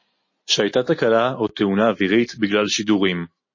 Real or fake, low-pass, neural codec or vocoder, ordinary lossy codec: real; 7.2 kHz; none; MP3, 32 kbps